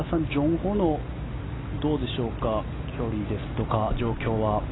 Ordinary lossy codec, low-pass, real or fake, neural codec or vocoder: AAC, 16 kbps; 7.2 kHz; real; none